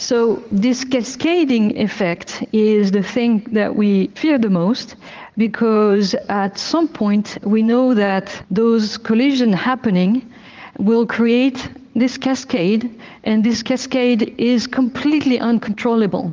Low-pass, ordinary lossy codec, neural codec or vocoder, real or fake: 7.2 kHz; Opus, 24 kbps; vocoder, 44.1 kHz, 80 mel bands, Vocos; fake